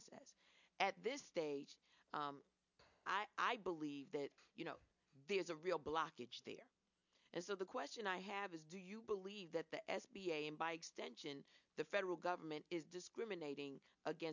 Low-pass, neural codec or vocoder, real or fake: 7.2 kHz; none; real